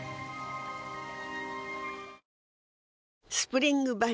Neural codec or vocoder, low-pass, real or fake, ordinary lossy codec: none; none; real; none